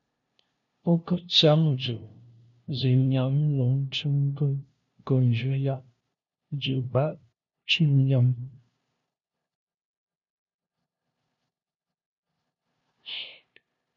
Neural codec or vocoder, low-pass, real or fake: codec, 16 kHz, 0.5 kbps, FunCodec, trained on LibriTTS, 25 frames a second; 7.2 kHz; fake